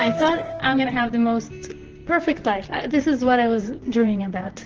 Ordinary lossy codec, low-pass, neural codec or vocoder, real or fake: Opus, 16 kbps; 7.2 kHz; vocoder, 44.1 kHz, 128 mel bands, Pupu-Vocoder; fake